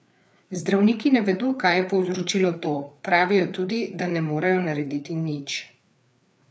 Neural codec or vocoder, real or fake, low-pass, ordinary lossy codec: codec, 16 kHz, 4 kbps, FreqCodec, larger model; fake; none; none